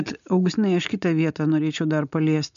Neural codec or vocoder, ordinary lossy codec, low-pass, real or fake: none; MP3, 64 kbps; 7.2 kHz; real